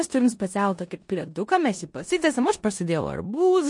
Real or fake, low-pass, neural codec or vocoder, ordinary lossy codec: fake; 10.8 kHz; codec, 16 kHz in and 24 kHz out, 0.9 kbps, LongCat-Audio-Codec, four codebook decoder; MP3, 48 kbps